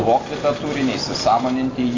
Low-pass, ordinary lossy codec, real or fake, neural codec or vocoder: 7.2 kHz; AAC, 32 kbps; real; none